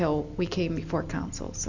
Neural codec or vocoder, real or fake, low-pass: none; real; 7.2 kHz